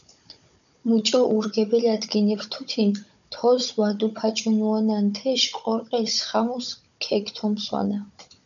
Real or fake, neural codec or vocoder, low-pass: fake; codec, 16 kHz, 16 kbps, FunCodec, trained on Chinese and English, 50 frames a second; 7.2 kHz